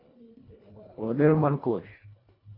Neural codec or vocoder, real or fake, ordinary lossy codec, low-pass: codec, 24 kHz, 1.5 kbps, HILCodec; fake; AAC, 24 kbps; 5.4 kHz